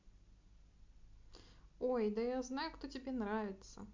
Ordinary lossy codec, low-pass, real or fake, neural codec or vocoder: MP3, 64 kbps; 7.2 kHz; real; none